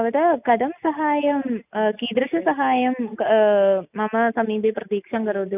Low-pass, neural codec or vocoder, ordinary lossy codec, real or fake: 3.6 kHz; none; none; real